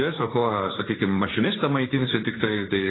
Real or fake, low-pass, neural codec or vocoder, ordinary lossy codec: fake; 7.2 kHz; codec, 16 kHz, 1.1 kbps, Voila-Tokenizer; AAC, 16 kbps